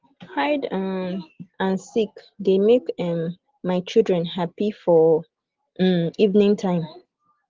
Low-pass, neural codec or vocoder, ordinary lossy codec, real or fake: 7.2 kHz; none; Opus, 16 kbps; real